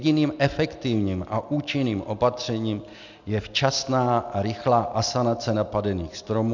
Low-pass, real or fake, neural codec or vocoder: 7.2 kHz; real; none